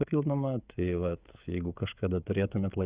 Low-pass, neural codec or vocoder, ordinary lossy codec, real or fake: 3.6 kHz; codec, 16 kHz, 16 kbps, FreqCodec, smaller model; Opus, 64 kbps; fake